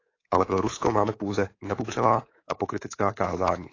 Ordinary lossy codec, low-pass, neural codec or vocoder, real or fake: AAC, 32 kbps; 7.2 kHz; codec, 24 kHz, 3.1 kbps, DualCodec; fake